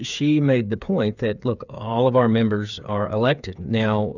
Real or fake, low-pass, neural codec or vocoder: fake; 7.2 kHz; codec, 16 kHz, 8 kbps, FreqCodec, smaller model